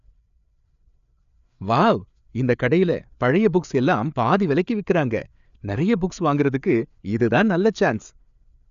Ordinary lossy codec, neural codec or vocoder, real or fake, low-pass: none; codec, 16 kHz, 4 kbps, FreqCodec, larger model; fake; 7.2 kHz